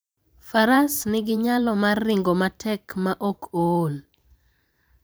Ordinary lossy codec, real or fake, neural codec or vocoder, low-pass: none; fake; vocoder, 44.1 kHz, 128 mel bands every 512 samples, BigVGAN v2; none